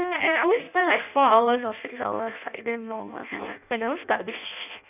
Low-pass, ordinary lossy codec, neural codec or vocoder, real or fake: 3.6 kHz; none; codec, 16 kHz in and 24 kHz out, 0.6 kbps, FireRedTTS-2 codec; fake